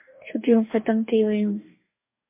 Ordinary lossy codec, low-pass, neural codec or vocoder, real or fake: MP3, 24 kbps; 3.6 kHz; codec, 44.1 kHz, 2.6 kbps, DAC; fake